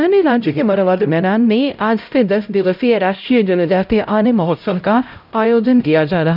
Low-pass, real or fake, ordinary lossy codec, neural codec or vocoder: 5.4 kHz; fake; none; codec, 16 kHz, 0.5 kbps, X-Codec, HuBERT features, trained on LibriSpeech